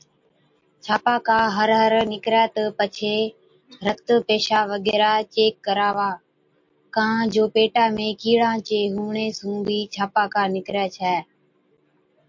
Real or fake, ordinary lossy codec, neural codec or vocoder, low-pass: real; MP3, 48 kbps; none; 7.2 kHz